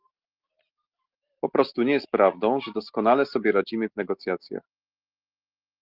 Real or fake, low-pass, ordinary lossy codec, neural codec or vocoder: real; 5.4 kHz; Opus, 32 kbps; none